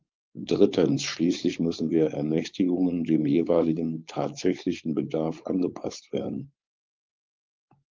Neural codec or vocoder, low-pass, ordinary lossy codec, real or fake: codec, 16 kHz, 4.8 kbps, FACodec; 7.2 kHz; Opus, 32 kbps; fake